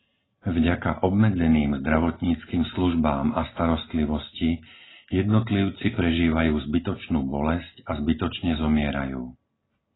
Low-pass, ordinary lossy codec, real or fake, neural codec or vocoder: 7.2 kHz; AAC, 16 kbps; real; none